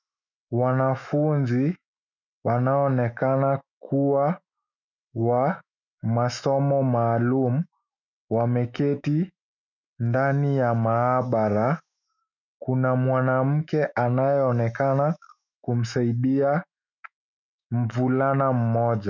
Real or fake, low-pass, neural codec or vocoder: fake; 7.2 kHz; autoencoder, 48 kHz, 128 numbers a frame, DAC-VAE, trained on Japanese speech